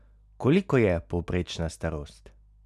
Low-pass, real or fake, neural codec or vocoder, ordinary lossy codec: none; real; none; none